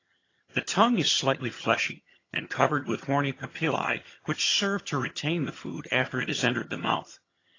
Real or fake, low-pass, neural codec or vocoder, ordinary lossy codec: fake; 7.2 kHz; vocoder, 22.05 kHz, 80 mel bands, HiFi-GAN; AAC, 32 kbps